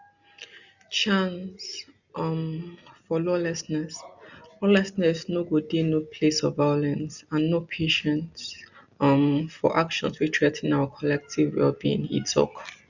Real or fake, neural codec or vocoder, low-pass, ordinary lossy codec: real; none; 7.2 kHz; none